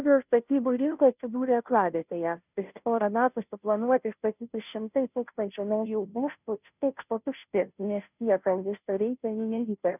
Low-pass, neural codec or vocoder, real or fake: 3.6 kHz; codec, 16 kHz, 0.5 kbps, FunCodec, trained on Chinese and English, 25 frames a second; fake